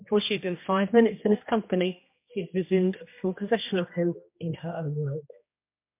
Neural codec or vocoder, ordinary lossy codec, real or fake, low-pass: codec, 16 kHz, 1 kbps, X-Codec, HuBERT features, trained on general audio; MP3, 32 kbps; fake; 3.6 kHz